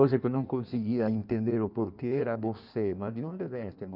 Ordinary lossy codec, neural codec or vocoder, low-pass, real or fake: none; codec, 16 kHz in and 24 kHz out, 1.1 kbps, FireRedTTS-2 codec; 5.4 kHz; fake